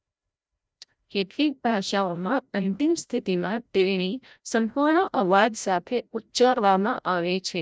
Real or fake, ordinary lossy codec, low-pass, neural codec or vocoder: fake; none; none; codec, 16 kHz, 0.5 kbps, FreqCodec, larger model